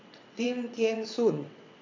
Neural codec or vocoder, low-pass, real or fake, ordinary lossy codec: vocoder, 22.05 kHz, 80 mel bands, WaveNeXt; 7.2 kHz; fake; MP3, 64 kbps